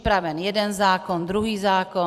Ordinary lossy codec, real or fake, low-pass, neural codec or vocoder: Opus, 64 kbps; fake; 14.4 kHz; vocoder, 44.1 kHz, 128 mel bands every 256 samples, BigVGAN v2